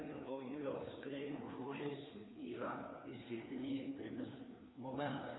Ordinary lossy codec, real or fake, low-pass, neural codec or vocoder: AAC, 16 kbps; fake; 7.2 kHz; codec, 16 kHz, 2 kbps, FreqCodec, larger model